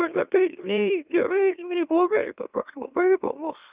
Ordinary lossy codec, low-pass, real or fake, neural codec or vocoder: Opus, 64 kbps; 3.6 kHz; fake; autoencoder, 44.1 kHz, a latent of 192 numbers a frame, MeloTTS